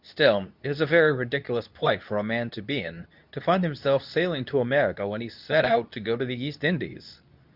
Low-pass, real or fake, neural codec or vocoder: 5.4 kHz; fake; codec, 24 kHz, 0.9 kbps, WavTokenizer, medium speech release version 2